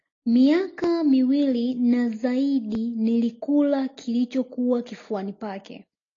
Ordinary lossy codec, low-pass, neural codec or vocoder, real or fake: AAC, 32 kbps; 7.2 kHz; none; real